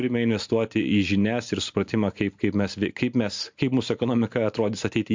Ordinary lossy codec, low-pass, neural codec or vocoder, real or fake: MP3, 64 kbps; 7.2 kHz; none; real